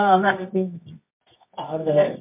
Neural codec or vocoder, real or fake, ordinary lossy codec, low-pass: codec, 24 kHz, 0.9 kbps, WavTokenizer, medium music audio release; fake; MP3, 32 kbps; 3.6 kHz